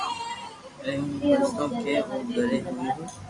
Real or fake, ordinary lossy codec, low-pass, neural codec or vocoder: fake; MP3, 96 kbps; 10.8 kHz; vocoder, 44.1 kHz, 128 mel bands every 256 samples, BigVGAN v2